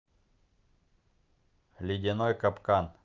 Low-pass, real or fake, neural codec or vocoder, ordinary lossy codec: 7.2 kHz; real; none; Opus, 24 kbps